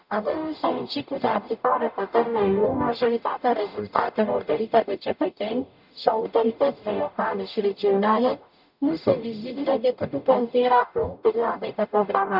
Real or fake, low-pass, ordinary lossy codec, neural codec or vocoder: fake; 5.4 kHz; none; codec, 44.1 kHz, 0.9 kbps, DAC